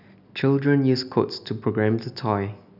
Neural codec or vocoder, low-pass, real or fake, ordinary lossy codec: none; 5.4 kHz; real; none